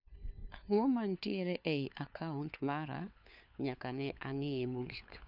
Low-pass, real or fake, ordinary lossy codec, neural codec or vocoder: 5.4 kHz; fake; none; codec, 16 kHz, 4 kbps, FunCodec, trained on LibriTTS, 50 frames a second